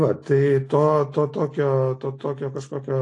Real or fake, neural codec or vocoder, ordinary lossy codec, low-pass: real; none; AAC, 48 kbps; 10.8 kHz